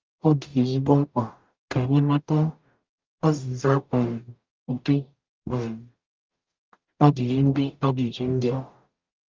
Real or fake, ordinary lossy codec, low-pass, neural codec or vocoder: fake; Opus, 24 kbps; 7.2 kHz; codec, 44.1 kHz, 0.9 kbps, DAC